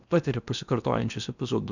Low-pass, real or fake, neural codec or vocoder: 7.2 kHz; fake; codec, 16 kHz in and 24 kHz out, 0.8 kbps, FocalCodec, streaming, 65536 codes